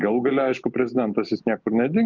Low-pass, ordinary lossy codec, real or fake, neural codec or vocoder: 7.2 kHz; Opus, 24 kbps; real; none